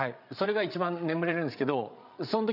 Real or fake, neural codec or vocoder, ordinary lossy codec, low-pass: fake; codec, 16 kHz, 8 kbps, FreqCodec, larger model; none; 5.4 kHz